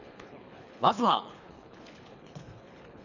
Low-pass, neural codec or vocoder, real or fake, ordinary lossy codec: 7.2 kHz; codec, 24 kHz, 3 kbps, HILCodec; fake; none